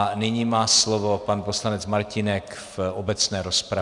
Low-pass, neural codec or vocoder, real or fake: 10.8 kHz; none; real